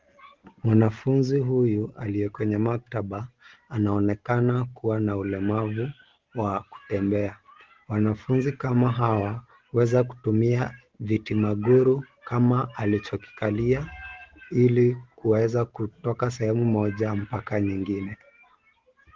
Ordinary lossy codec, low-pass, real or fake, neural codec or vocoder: Opus, 16 kbps; 7.2 kHz; real; none